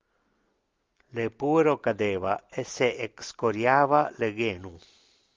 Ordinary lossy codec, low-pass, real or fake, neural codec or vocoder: Opus, 24 kbps; 7.2 kHz; real; none